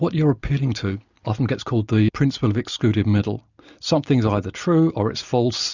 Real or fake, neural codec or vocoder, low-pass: real; none; 7.2 kHz